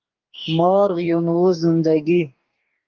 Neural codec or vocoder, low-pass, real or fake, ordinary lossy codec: codec, 44.1 kHz, 2.6 kbps, DAC; 7.2 kHz; fake; Opus, 32 kbps